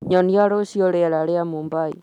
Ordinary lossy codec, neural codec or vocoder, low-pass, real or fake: none; none; 19.8 kHz; real